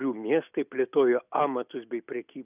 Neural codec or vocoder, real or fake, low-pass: none; real; 3.6 kHz